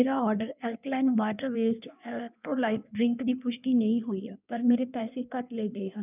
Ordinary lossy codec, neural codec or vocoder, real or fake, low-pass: none; codec, 16 kHz in and 24 kHz out, 1.1 kbps, FireRedTTS-2 codec; fake; 3.6 kHz